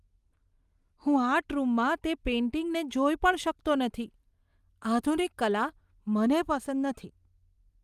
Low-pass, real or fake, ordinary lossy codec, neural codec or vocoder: 14.4 kHz; real; Opus, 32 kbps; none